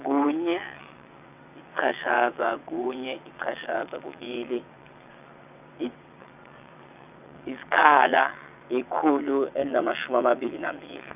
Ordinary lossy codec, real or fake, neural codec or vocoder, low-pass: none; fake; vocoder, 22.05 kHz, 80 mel bands, WaveNeXt; 3.6 kHz